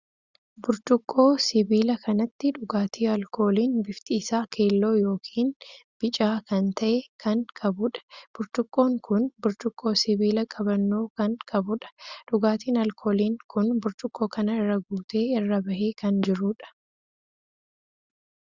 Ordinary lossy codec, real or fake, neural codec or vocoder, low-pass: Opus, 64 kbps; real; none; 7.2 kHz